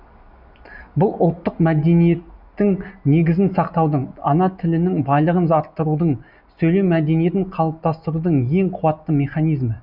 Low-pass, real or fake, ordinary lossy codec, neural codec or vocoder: 5.4 kHz; real; none; none